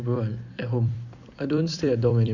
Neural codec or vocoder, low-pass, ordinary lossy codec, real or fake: vocoder, 44.1 kHz, 128 mel bands every 256 samples, BigVGAN v2; 7.2 kHz; none; fake